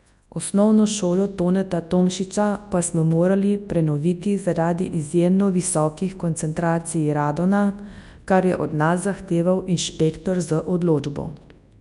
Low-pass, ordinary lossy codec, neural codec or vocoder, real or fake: 10.8 kHz; none; codec, 24 kHz, 0.9 kbps, WavTokenizer, large speech release; fake